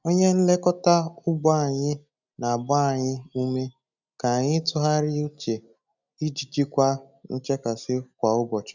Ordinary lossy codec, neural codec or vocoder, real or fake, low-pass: none; none; real; 7.2 kHz